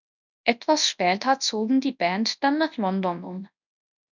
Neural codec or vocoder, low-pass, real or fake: codec, 24 kHz, 0.9 kbps, WavTokenizer, large speech release; 7.2 kHz; fake